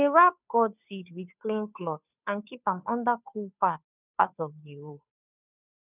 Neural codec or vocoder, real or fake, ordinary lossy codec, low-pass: codec, 16 kHz, 2 kbps, FunCodec, trained on Chinese and English, 25 frames a second; fake; none; 3.6 kHz